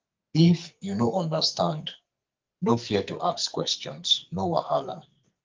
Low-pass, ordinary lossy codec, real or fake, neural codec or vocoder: 7.2 kHz; Opus, 24 kbps; fake; codec, 44.1 kHz, 2.6 kbps, SNAC